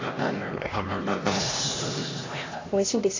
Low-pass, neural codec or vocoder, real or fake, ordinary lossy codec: 7.2 kHz; codec, 16 kHz, 1 kbps, X-Codec, HuBERT features, trained on LibriSpeech; fake; AAC, 48 kbps